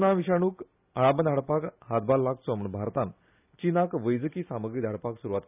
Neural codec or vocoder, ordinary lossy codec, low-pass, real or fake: none; none; 3.6 kHz; real